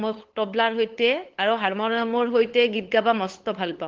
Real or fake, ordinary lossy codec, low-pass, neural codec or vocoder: fake; Opus, 16 kbps; 7.2 kHz; codec, 16 kHz, 8 kbps, FunCodec, trained on LibriTTS, 25 frames a second